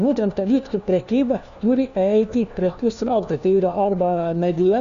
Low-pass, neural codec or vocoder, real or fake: 7.2 kHz; codec, 16 kHz, 1 kbps, FunCodec, trained on LibriTTS, 50 frames a second; fake